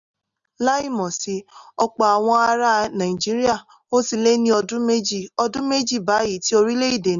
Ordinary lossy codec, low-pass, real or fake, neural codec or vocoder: none; 7.2 kHz; real; none